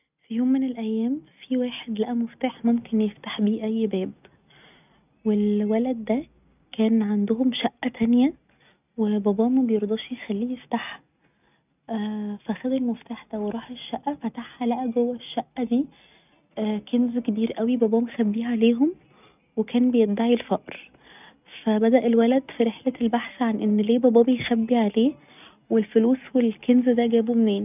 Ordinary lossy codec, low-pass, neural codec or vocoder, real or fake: none; 3.6 kHz; none; real